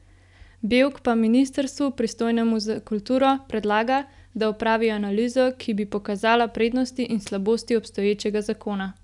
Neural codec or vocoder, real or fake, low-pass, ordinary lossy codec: none; real; 10.8 kHz; none